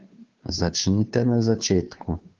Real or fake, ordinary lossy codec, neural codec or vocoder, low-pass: fake; Opus, 24 kbps; codec, 16 kHz, 2 kbps, FunCodec, trained on Chinese and English, 25 frames a second; 7.2 kHz